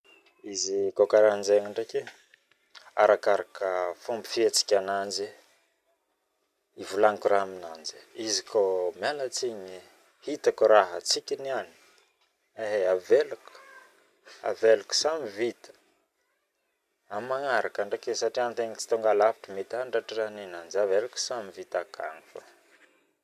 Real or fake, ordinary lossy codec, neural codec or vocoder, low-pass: real; none; none; 14.4 kHz